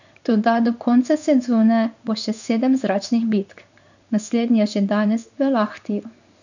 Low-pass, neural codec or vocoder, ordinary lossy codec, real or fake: 7.2 kHz; codec, 16 kHz in and 24 kHz out, 1 kbps, XY-Tokenizer; none; fake